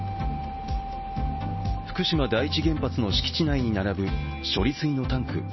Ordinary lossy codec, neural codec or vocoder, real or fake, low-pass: MP3, 24 kbps; none; real; 7.2 kHz